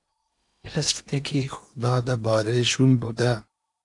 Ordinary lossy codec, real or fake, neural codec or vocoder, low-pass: AAC, 64 kbps; fake; codec, 16 kHz in and 24 kHz out, 0.8 kbps, FocalCodec, streaming, 65536 codes; 10.8 kHz